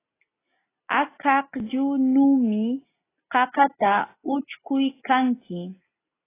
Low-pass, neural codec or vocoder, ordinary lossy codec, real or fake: 3.6 kHz; none; AAC, 16 kbps; real